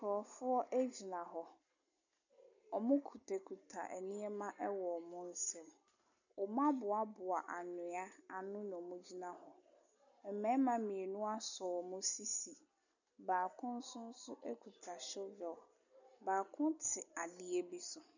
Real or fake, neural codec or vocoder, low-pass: real; none; 7.2 kHz